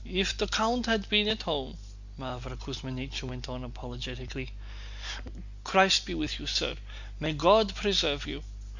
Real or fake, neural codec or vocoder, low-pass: real; none; 7.2 kHz